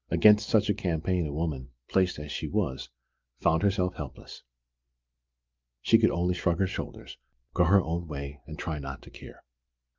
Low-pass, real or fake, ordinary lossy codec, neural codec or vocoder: 7.2 kHz; real; Opus, 32 kbps; none